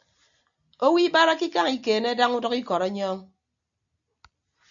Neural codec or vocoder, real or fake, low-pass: none; real; 7.2 kHz